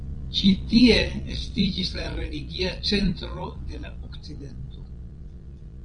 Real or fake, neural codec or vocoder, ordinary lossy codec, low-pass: fake; vocoder, 22.05 kHz, 80 mel bands, Vocos; Opus, 64 kbps; 9.9 kHz